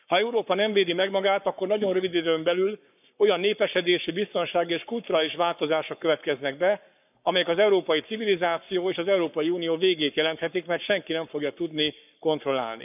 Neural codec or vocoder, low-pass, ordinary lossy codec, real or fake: codec, 44.1 kHz, 7.8 kbps, Pupu-Codec; 3.6 kHz; none; fake